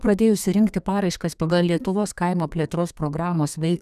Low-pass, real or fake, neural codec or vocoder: 14.4 kHz; fake; codec, 32 kHz, 1.9 kbps, SNAC